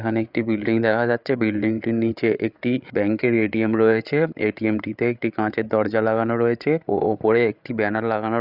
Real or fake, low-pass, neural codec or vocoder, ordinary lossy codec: fake; 5.4 kHz; codec, 16 kHz, 8 kbps, FreqCodec, larger model; Opus, 64 kbps